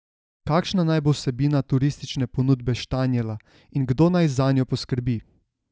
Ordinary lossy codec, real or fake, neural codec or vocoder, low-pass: none; real; none; none